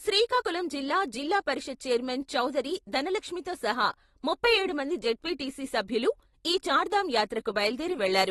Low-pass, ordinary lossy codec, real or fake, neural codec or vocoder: 19.8 kHz; AAC, 32 kbps; real; none